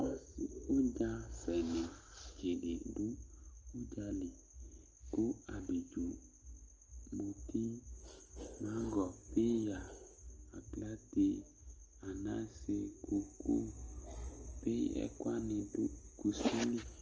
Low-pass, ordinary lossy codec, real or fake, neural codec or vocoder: 7.2 kHz; Opus, 24 kbps; real; none